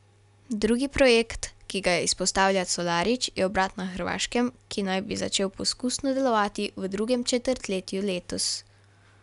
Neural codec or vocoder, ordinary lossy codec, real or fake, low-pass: none; none; real; 10.8 kHz